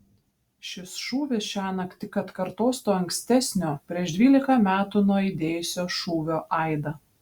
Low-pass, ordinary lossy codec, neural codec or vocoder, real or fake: 19.8 kHz; Opus, 64 kbps; none; real